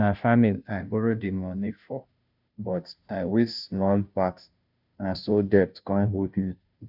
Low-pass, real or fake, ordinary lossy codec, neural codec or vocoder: 5.4 kHz; fake; none; codec, 16 kHz, 0.5 kbps, FunCodec, trained on Chinese and English, 25 frames a second